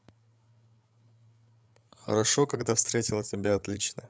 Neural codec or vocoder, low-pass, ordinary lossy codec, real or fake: codec, 16 kHz, 8 kbps, FreqCodec, larger model; none; none; fake